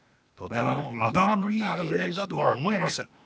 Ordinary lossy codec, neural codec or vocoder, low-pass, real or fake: none; codec, 16 kHz, 0.8 kbps, ZipCodec; none; fake